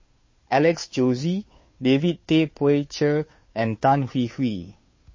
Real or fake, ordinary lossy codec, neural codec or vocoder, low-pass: fake; MP3, 32 kbps; codec, 16 kHz, 2 kbps, FunCodec, trained on Chinese and English, 25 frames a second; 7.2 kHz